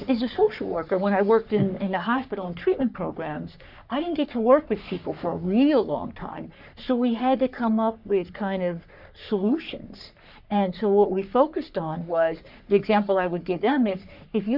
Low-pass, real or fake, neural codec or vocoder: 5.4 kHz; fake; codec, 44.1 kHz, 3.4 kbps, Pupu-Codec